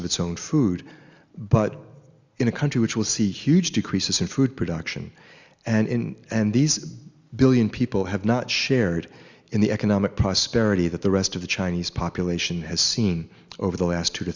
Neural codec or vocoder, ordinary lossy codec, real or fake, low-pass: none; Opus, 64 kbps; real; 7.2 kHz